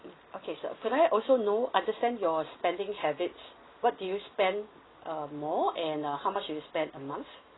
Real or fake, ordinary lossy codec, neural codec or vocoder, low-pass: real; AAC, 16 kbps; none; 7.2 kHz